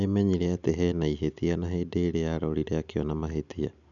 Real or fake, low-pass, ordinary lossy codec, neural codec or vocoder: real; 7.2 kHz; none; none